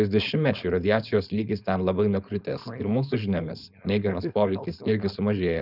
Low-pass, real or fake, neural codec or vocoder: 5.4 kHz; fake; codec, 16 kHz, 4.8 kbps, FACodec